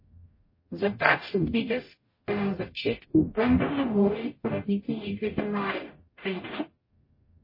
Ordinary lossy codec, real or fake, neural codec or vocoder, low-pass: MP3, 24 kbps; fake; codec, 44.1 kHz, 0.9 kbps, DAC; 5.4 kHz